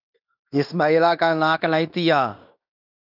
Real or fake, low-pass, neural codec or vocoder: fake; 5.4 kHz; codec, 16 kHz in and 24 kHz out, 0.9 kbps, LongCat-Audio-Codec, fine tuned four codebook decoder